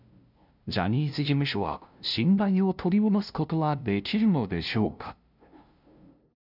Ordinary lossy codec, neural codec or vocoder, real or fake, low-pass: none; codec, 16 kHz, 0.5 kbps, FunCodec, trained on LibriTTS, 25 frames a second; fake; 5.4 kHz